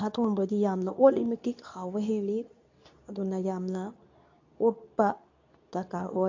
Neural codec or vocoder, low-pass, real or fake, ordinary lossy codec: codec, 24 kHz, 0.9 kbps, WavTokenizer, medium speech release version 2; 7.2 kHz; fake; AAC, 48 kbps